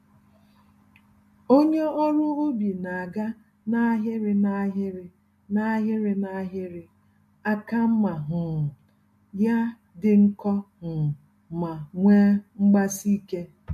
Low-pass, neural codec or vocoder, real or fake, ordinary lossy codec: 14.4 kHz; none; real; MP3, 64 kbps